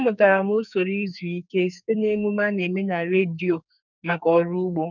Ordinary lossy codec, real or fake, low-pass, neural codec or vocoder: none; fake; 7.2 kHz; codec, 44.1 kHz, 2.6 kbps, SNAC